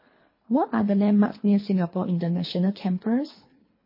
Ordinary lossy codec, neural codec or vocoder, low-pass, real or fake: MP3, 24 kbps; codec, 24 kHz, 3 kbps, HILCodec; 5.4 kHz; fake